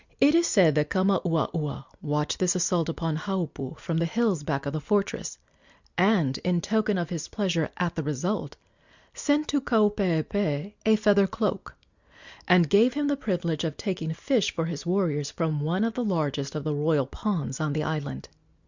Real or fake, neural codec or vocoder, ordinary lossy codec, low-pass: real; none; Opus, 64 kbps; 7.2 kHz